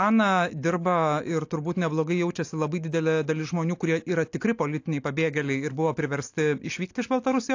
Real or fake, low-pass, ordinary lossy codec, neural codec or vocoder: real; 7.2 kHz; AAC, 48 kbps; none